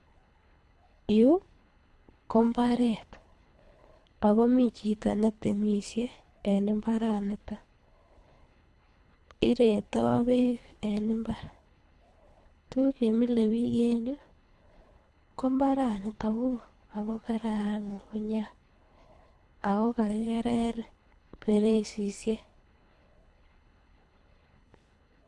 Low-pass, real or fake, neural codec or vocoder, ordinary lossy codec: none; fake; codec, 24 kHz, 3 kbps, HILCodec; none